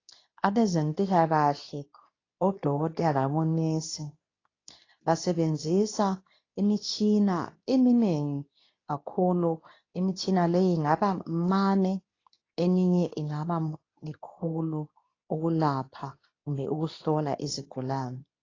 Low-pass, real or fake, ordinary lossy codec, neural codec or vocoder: 7.2 kHz; fake; AAC, 32 kbps; codec, 24 kHz, 0.9 kbps, WavTokenizer, medium speech release version 2